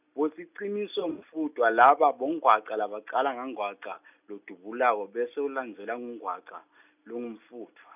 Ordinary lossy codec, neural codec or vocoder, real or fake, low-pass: none; none; real; 3.6 kHz